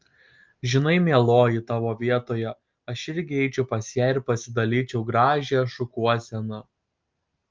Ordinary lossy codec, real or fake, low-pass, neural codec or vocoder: Opus, 24 kbps; real; 7.2 kHz; none